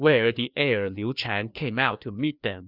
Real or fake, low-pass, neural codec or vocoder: fake; 5.4 kHz; codec, 16 kHz, 2 kbps, FreqCodec, larger model